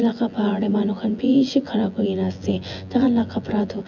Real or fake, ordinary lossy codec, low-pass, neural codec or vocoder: fake; none; 7.2 kHz; vocoder, 24 kHz, 100 mel bands, Vocos